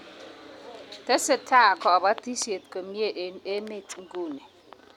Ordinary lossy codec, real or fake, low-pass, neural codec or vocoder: none; real; 19.8 kHz; none